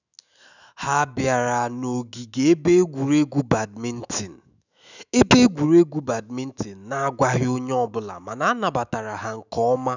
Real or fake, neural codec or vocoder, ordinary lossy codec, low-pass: fake; autoencoder, 48 kHz, 128 numbers a frame, DAC-VAE, trained on Japanese speech; none; 7.2 kHz